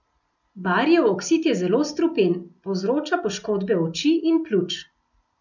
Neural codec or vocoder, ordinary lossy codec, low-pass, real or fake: none; none; 7.2 kHz; real